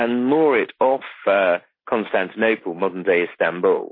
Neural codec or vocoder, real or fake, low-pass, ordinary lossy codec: none; real; 5.4 kHz; MP3, 24 kbps